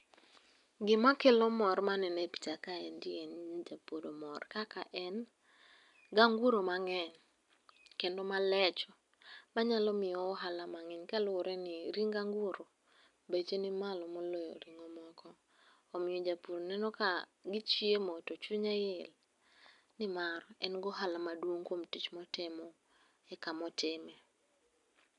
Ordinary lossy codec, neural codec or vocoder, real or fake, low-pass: none; none; real; 10.8 kHz